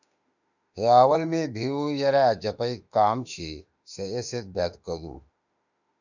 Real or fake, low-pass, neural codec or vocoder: fake; 7.2 kHz; autoencoder, 48 kHz, 32 numbers a frame, DAC-VAE, trained on Japanese speech